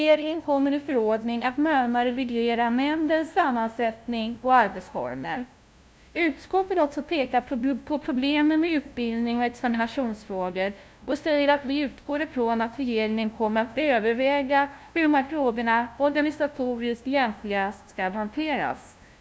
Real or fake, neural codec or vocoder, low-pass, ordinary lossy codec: fake; codec, 16 kHz, 0.5 kbps, FunCodec, trained on LibriTTS, 25 frames a second; none; none